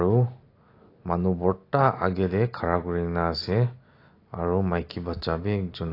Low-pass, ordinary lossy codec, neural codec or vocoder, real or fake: 5.4 kHz; AAC, 32 kbps; none; real